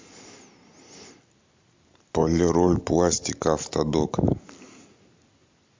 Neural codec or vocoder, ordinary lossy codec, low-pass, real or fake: none; MP3, 48 kbps; 7.2 kHz; real